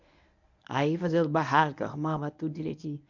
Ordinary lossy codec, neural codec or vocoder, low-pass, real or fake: none; codec, 24 kHz, 0.9 kbps, WavTokenizer, medium speech release version 1; 7.2 kHz; fake